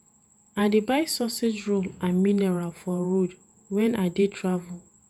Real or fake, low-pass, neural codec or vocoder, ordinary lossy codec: fake; 19.8 kHz; vocoder, 48 kHz, 128 mel bands, Vocos; none